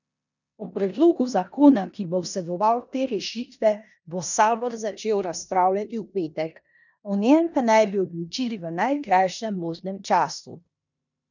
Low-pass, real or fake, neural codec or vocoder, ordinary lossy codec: 7.2 kHz; fake; codec, 16 kHz in and 24 kHz out, 0.9 kbps, LongCat-Audio-Codec, four codebook decoder; none